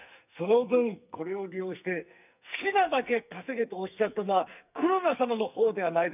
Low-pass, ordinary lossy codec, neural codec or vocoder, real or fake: 3.6 kHz; none; codec, 44.1 kHz, 2.6 kbps, SNAC; fake